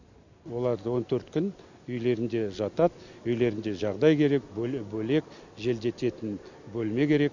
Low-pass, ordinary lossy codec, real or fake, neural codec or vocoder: 7.2 kHz; none; real; none